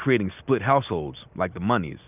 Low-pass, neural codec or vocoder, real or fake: 3.6 kHz; none; real